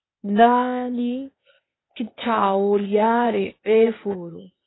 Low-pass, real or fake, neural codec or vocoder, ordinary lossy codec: 7.2 kHz; fake; codec, 16 kHz, 0.8 kbps, ZipCodec; AAC, 16 kbps